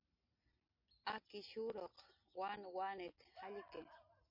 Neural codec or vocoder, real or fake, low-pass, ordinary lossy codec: none; real; 5.4 kHz; MP3, 32 kbps